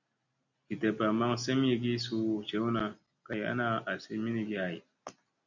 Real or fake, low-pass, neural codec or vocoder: real; 7.2 kHz; none